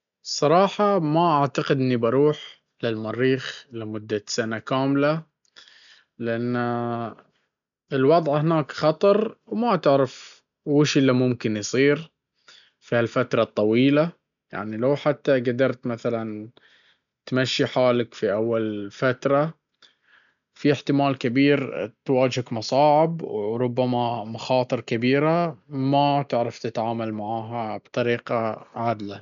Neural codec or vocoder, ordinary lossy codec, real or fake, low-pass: none; MP3, 96 kbps; real; 7.2 kHz